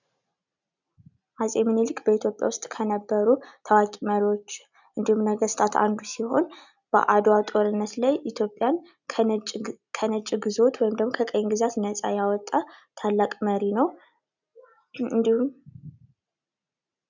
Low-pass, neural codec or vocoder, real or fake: 7.2 kHz; none; real